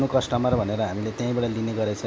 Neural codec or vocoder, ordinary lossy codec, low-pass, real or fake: none; none; none; real